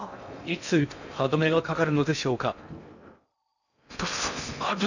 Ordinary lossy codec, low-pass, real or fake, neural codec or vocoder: none; 7.2 kHz; fake; codec, 16 kHz in and 24 kHz out, 0.6 kbps, FocalCodec, streaming, 2048 codes